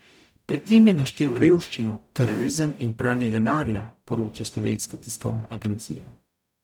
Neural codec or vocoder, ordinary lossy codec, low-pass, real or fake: codec, 44.1 kHz, 0.9 kbps, DAC; none; 19.8 kHz; fake